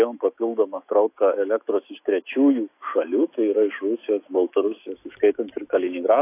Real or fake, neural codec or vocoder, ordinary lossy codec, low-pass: fake; autoencoder, 48 kHz, 128 numbers a frame, DAC-VAE, trained on Japanese speech; AAC, 24 kbps; 3.6 kHz